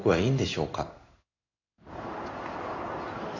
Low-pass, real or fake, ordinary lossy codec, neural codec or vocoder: 7.2 kHz; fake; none; codec, 16 kHz in and 24 kHz out, 1 kbps, XY-Tokenizer